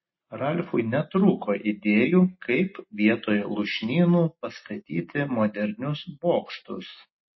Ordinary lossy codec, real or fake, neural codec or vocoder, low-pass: MP3, 24 kbps; real; none; 7.2 kHz